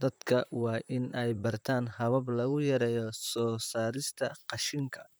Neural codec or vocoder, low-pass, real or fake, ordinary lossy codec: vocoder, 44.1 kHz, 128 mel bands, Pupu-Vocoder; none; fake; none